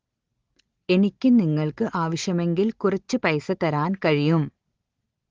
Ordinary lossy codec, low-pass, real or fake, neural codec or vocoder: Opus, 16 kbps; 7.2 kHz; real; none